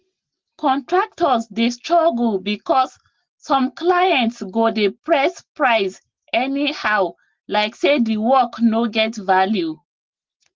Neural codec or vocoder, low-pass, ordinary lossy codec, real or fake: none; 7.2 kHz; Opus, 16 kbps; real